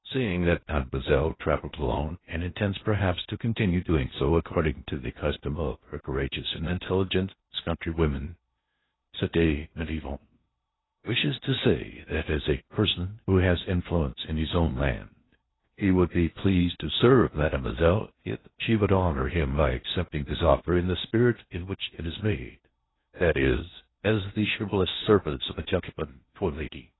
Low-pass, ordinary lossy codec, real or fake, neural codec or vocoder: 7.2 kHz; AAC, 16 kbps; fake; codec, 16 kHz in and 24 kHz out, 0.8 kbps, FocalCodec, streaming, 65536 codes